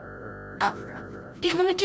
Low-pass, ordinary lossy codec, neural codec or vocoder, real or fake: none; none; codec, 16 kHz, 0.5 kbps, FreqCodec, larger model; fake